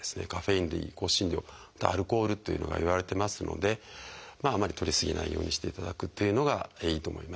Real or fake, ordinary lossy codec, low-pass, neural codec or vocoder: real; none; none; none